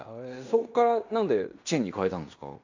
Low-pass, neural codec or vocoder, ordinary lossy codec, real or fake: 7.2 kHz; codec, 16 kHz in and 24 kHz out, 0.9 kbps, LongCat-Audio-Codec, fine tuned four codebook decoder; AAC, 48 kbps; fake